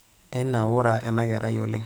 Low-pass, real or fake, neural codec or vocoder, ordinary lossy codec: none; fake; codec, 44.1 kHz, 2.6 kbps, SNAC; none